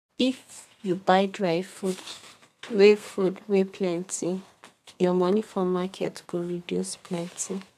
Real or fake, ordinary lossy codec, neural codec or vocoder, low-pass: fake; MP3, 96 kbps; codec, 32 kHz, 1.9 kbps, SNAC; 14.4 kHz